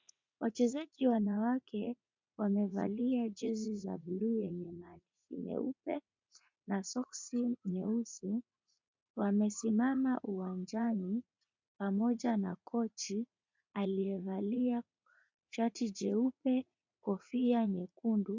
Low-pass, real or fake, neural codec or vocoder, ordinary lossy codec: 7.2 kHz; fake; vocoder, 44.1 kHz, 80 mel bands, Vocos; MP3, 64 kbps